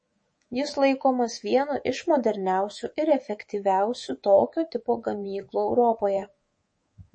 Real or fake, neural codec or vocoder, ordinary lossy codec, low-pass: fake; codec, 24 kHz, 3.1 kbps, DualCodec; MP3, 32 kbps; 9.9 kHz